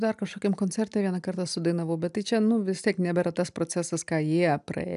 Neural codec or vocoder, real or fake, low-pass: none; real; 10.8 kHz